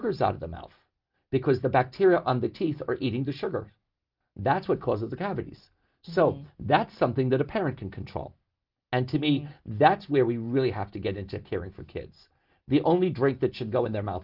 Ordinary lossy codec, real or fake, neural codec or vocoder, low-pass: Opus, 24 kbps; real; none; 5.4 kHz